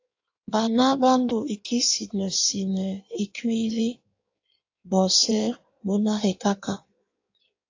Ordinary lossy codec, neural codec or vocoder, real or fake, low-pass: AAC, 48 kbps; codec, 16 kHz in and 24 kHz out, 1.1 kbps, FireRedTTS-2 codec; fake; 7.2 kHz